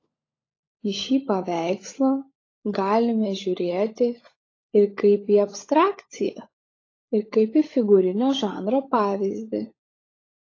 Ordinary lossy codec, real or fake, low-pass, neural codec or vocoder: AAC, 32 kbps; fake; 7.2 kHz; codec, 16 kHz, 16 kbps, FunCodec, trained on LibriTTS, 50 frames a second